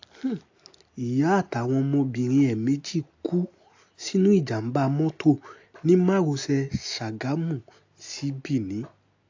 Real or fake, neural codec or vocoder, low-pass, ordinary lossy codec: real; none; 7.2 kHz; AAC, 48 kbps